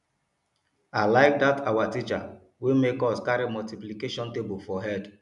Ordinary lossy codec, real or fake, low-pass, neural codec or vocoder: none; real; 10.8 kHz; none